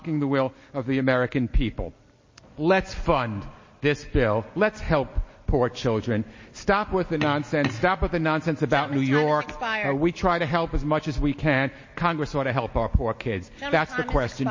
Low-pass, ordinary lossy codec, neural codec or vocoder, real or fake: 7.2 kHz; MP3, 32 kbps; none; real